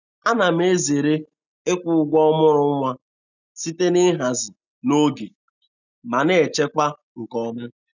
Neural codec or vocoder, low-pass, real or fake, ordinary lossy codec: none; 7.2 kHz; real; none